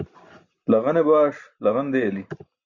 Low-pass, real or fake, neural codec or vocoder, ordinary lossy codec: 7.2 kHz; real; none; Opus, 64 kbps